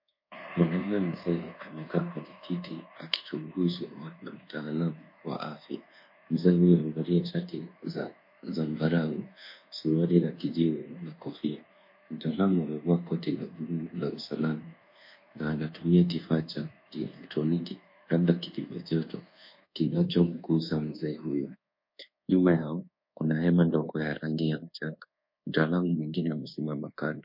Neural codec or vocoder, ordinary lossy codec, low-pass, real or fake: codec, 24 kHz, 1.2 kbps, DualCodec; MP3, 32 kbps; 5.4 kHz; fake